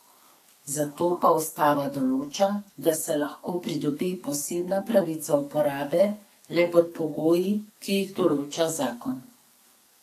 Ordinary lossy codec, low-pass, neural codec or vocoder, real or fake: AAC, 64 kbps; 14.4 kHz; codec, 44.1 kHz, 2.6 kbps, SNAC; fake